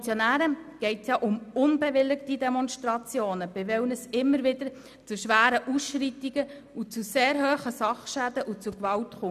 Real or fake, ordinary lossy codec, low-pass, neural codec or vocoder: real; none; 14.4 kHz; none